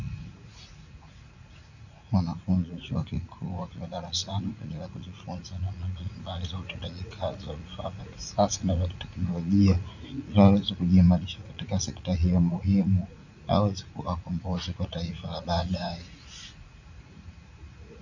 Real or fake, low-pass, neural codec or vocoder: fake; 7.2 kHz; vocoder, 44.1 kHz, 80 mel bands, Vocos